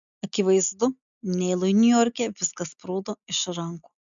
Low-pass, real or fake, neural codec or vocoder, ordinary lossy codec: 7.2 kHz; real; none; MP3, 96 kbps